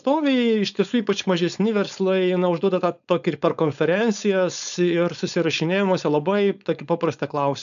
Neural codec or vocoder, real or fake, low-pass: codec, 16 kHz, 4.8 kbps, FACodec; fake; 7.2 kHz